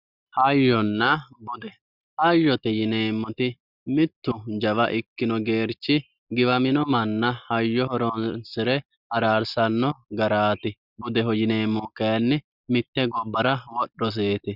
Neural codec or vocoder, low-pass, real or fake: none; 5.4 kHz; real